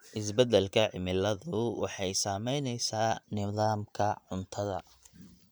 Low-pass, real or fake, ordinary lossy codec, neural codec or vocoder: none; fake; none; vocoder, 44.1 kHz, 128 mel bands every 512 samples, BigVGAN v2